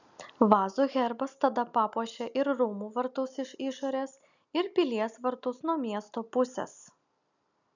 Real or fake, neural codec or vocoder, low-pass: real; none; 7.2 kHz